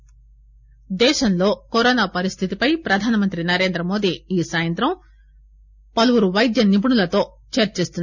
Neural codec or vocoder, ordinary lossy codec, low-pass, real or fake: none; none; 7.2 kHz; real